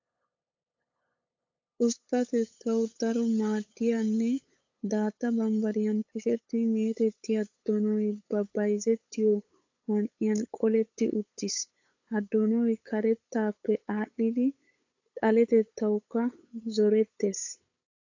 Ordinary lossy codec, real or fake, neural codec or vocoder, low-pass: AAC, 48 kbps; fake; codec, 16 kHz, 8 kbps, FunCodec, trained on LibriTTS, 25 frames a second; 7.2 kHz